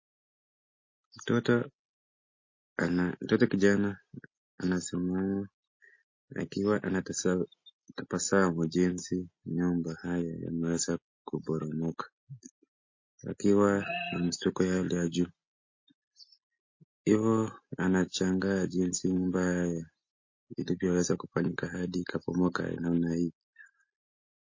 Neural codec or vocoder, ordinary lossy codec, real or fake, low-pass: none; MP3, 32 kbps; real; 7.2 kHz